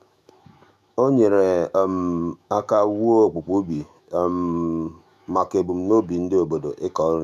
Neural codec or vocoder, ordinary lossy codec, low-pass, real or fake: autoencoder, 48 kHz, 128 numbers a frame, DAC-VAE, trained on Japanese speech; none; 14.4 kHz; fake